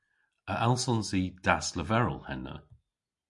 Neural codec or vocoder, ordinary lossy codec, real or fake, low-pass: none; MP3, 64 kbps; real; 10.8 kHz